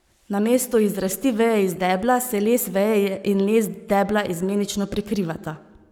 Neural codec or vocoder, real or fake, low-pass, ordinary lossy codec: codec, 44.1 kHz, 7.8 kbps, Pupu-Codec; fake; none; none